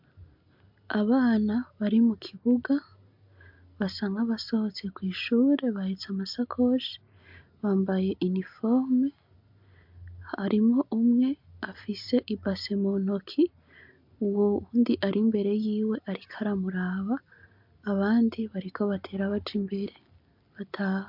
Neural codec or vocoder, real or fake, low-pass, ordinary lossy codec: none; real; 5.4 kHz; MP3, 48 kbps